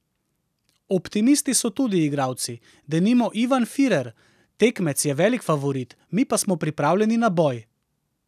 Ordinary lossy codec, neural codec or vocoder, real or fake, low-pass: none; none; real; 14.4 kHz